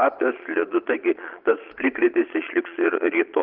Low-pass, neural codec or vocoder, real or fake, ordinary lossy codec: 5.4 kHz; vocoder, 22.05 kHz, 80 mel bands, Vocos; fake; Opus, 24 kbps